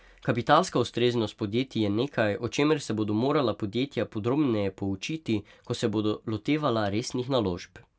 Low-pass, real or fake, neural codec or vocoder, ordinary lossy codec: none; real; none; none